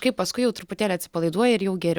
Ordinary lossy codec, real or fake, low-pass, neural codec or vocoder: Opus, 64 kbps; real; 19.8 kHz; none